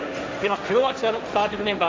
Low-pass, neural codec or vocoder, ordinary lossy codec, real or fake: none; codec, 16 kHz, 1.1 kbps, Voila-Tokenizer; none; fake